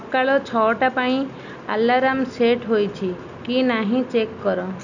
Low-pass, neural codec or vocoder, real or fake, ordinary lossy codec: 7.2 kHz; none; real; none